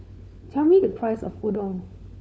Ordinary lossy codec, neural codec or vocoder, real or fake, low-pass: none; codec, 16 kHz, 4 kbps, FunCodec, trained on LibriTTS, 50 frames a second; fake; none